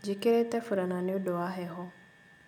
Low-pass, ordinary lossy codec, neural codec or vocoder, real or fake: 19.8 kHz; none; none; real